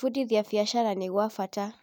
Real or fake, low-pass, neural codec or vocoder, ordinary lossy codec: real; none; none; none